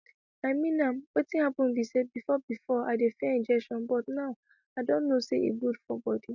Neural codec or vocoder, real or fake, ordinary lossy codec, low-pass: none; real; none; 7.2 kHz